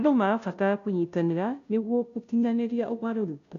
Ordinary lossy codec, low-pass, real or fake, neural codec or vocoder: none; 7.2 kHz; fake; codec, 16 kHz, 0.5 kbps, FunCodec, trained on Chinese and English, 25 frames a second